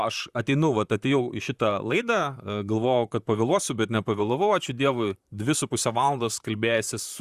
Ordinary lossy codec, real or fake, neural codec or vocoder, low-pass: Opus, 64 kbps; fake; vocoder, 44.1 kHz, 128 mel bands, Pupu-Vocoder; 14.4 kHz